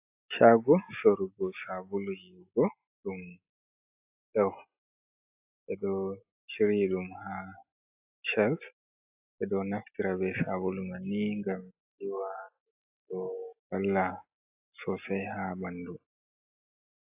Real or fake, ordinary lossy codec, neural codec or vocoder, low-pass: real; AAC, 32 kbps; none; 3.6 kHz